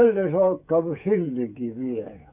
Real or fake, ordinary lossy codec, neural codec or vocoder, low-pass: fake; none; codec, 16 kHz, 8 kbps, FreqCodec, smaller model; 3.6 kHz